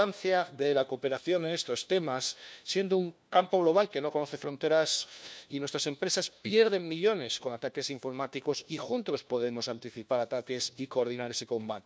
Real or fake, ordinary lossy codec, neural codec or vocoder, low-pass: fake; none; codec, 16 kHz, 1 kbps, FunCodec, trained on LibriTTS, 50 frames a second; none